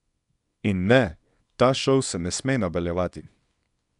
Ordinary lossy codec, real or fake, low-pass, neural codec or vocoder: none; fake; 10.8 kHz; codec, 24 kHz, 0.9 kbps, WavTokenizer, small release